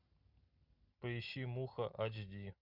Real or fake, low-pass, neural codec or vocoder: real; 5.4 kHz; none